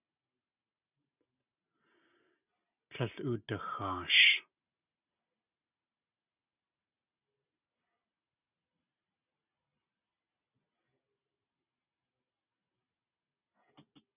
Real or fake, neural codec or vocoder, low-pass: real; none; 3.6 kHz